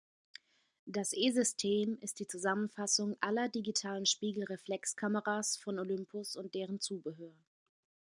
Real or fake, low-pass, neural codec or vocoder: real; 10.8 kHz; none